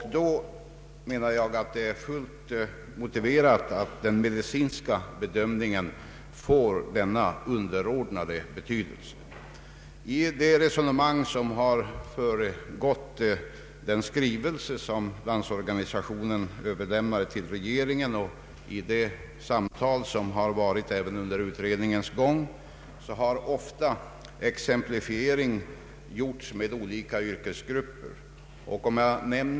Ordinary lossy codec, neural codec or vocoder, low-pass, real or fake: none; none; none; real